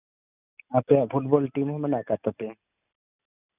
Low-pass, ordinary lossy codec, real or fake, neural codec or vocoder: 3.6 kHz; none; real; none